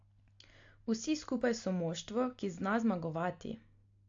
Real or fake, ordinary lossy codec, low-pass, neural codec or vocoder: real; AAC, 48 kbps; 7.2 kHz; none